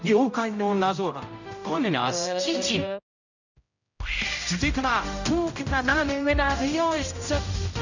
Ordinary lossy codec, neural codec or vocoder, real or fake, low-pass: none; codec, 16 kHz, 0.5 kbps, X-Codec, HuBERT features, trained on general audio; fake; 7.2 kHz